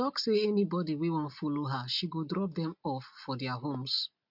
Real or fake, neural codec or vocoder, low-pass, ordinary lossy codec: real; none; 5.4 kHz; MP3, 48 kbps